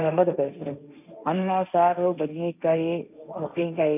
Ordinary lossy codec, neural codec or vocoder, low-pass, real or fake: none; codec, 16 kHz, 1.1 kbps, Voila-Tokenizer; 3.6 kHz; fake